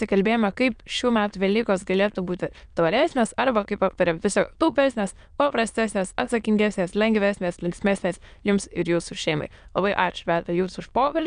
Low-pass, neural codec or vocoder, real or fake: 9.9 kHz; autoencoder, 22.05 kHz, a latent of 192 numbers a frame, VITS, trained on many speakers; fake